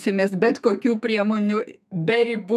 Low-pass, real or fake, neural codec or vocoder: 14.4 kHz; fake; codec, 32 kHz, 1.9 kbps, SNAC